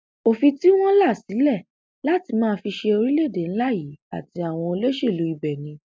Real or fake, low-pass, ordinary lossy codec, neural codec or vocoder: real; none; none; none